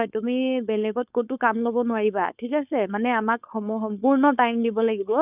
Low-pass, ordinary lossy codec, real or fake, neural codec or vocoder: 3.6 kHz; none; fake; codec, 16 kHz, 4.8 kbps, FACodec